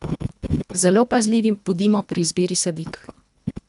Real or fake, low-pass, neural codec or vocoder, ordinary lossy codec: fake; 10.8 kHz; codec, 24 kHz, 1.5 kbps, HILCodec; none